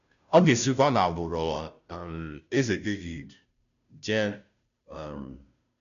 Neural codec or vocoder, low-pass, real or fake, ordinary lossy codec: codec, 16 kHz, 0.5 kbps, FunCodec, trained on Chinese and English, 25 frames a second; 7.2 kHz; fake; none